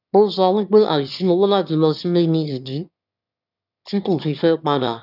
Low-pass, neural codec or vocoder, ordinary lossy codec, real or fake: 5.4 kHz; autoencoder, 22.05 kHz, a latent of 192 numbers a frame, VITS, trained on one speaker; none; fake